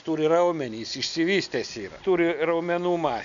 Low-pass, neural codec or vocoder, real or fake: 7.2 kHz; none; real